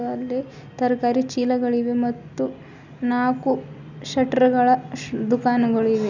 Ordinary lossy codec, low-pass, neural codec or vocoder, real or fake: none; 7.2 kHz; none; real